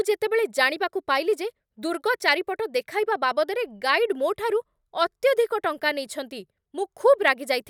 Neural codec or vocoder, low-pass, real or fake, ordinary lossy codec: none; 19.8 kHz; real; none